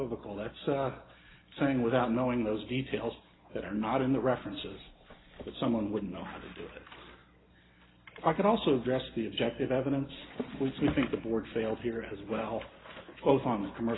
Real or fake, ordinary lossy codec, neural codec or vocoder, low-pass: real; AAC, 16 kbps; none; 7.2 kHz